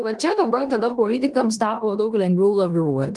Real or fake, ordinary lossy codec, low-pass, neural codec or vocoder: fake; Opus, 24 kbps; 10.8 kHz; codec, 16 kHz in and 24 kHz out, 0.9 kbps, LongCat-Audio-Codec, four codebook decoder